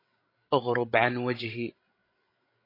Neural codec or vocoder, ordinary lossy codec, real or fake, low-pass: codec, 16 kHz, 16 kbps, FreqCodec, larger model; AAC, 24 kbps; fake; 5.4 kHz